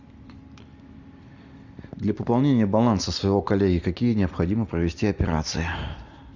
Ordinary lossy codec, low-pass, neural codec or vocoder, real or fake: Opus, 64 kbps; 7.2 kHz; none; real